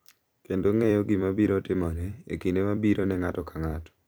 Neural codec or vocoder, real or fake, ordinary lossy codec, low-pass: vocoder, 44.1 kHz, 128 mel bands every 256 samples, BigVGAN v2; fake; none; none